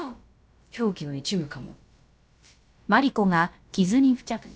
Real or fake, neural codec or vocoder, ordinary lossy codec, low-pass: fake; codec, 16 kHz, about 1 kbps, DyCAST, with the encoder's durations; none; none